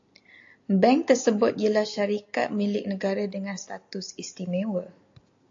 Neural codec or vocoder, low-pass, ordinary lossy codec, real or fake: none; 7.2 kHz; AAC, 48 kbps; real